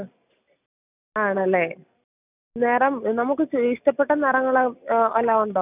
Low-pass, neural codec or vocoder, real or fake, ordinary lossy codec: 3.6 kHz; none; real; none